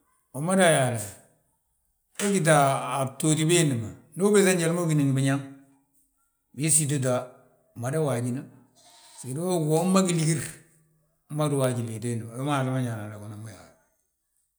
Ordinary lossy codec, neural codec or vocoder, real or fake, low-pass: none; none; real; none